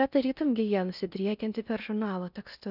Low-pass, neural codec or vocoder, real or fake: 5.4 kHz; codec, 16 kHz in and 24 kHz out, 0.6 kbps, FocalCodec, streaming, 4096 codes; fake